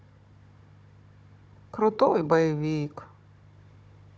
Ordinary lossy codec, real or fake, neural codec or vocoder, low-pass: none; fake; codec, 16 kHz, 16 kbps, FunCodec, trained on Chinese and English, 50 frames a second; none